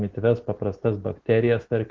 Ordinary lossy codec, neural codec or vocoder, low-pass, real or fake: Opus, 16 kbps; none; 7.2 kHz; real